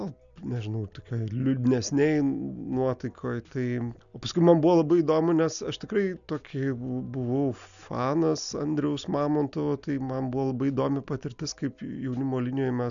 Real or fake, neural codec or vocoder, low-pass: real; none; 7.2 kHz